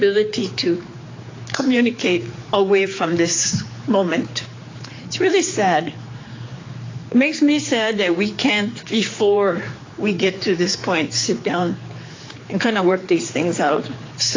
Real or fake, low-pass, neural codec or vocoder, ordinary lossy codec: fake; 7.2 kHz; codec, 16 kHz, 4 kbps, X-Codec, HuBERT features, trained on general audio; AAC, 32 kbps